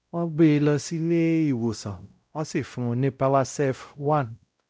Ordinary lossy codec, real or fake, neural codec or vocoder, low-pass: none; fake; codec, 16 kHz, 0.5 kbps, X-Codec, WavLM features, trained on Multilingual LibriSpeech; none